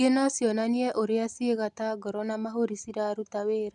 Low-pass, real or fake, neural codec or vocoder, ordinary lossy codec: 10.8 kHz; real; none; none